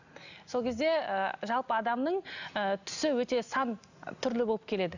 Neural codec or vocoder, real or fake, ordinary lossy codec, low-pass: none; real; none; 7.2 kHz